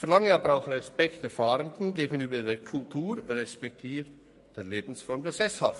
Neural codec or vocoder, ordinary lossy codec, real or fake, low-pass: codec, 44.1 kHz, 2.6 kbps, SNAC; MP3, 48 kbps; fake; 14.4 kHz